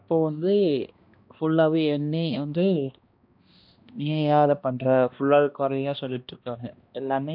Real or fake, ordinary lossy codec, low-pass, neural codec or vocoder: fake; MP3, 48 kbps; 5.4 kHz; codec, 16 kHz, 1 kbps, X-Codec, HuBERT features, trained on balanced general audio